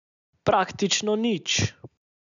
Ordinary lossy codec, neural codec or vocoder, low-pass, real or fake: none; none; 7.2 kHz; real